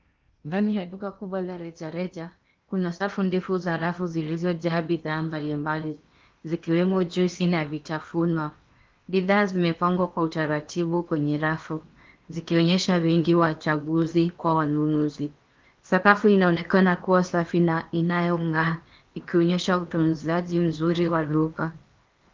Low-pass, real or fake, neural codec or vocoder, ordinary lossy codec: 7.2 kHz; fake; codec, 16 kHz in and 24 kHz out, 0.8 kbps, FocalCodec, streaming, 65536 codes; Opus, 32 kbps